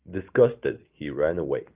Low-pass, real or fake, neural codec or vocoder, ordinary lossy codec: 3.6 kHz; real; none; Opus, 24 kbps